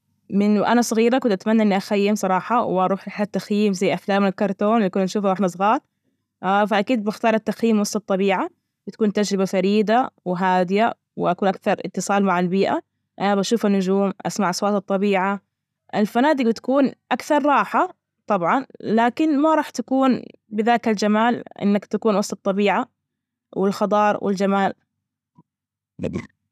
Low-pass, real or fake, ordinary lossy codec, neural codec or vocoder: 14.4 kHz; real; none; none